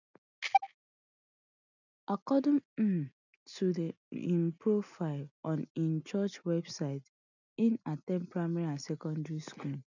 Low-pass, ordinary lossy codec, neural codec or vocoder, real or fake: 7.2 kHz; none; none; real